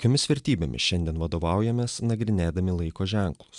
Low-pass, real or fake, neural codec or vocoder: 10.8 kHz; real; none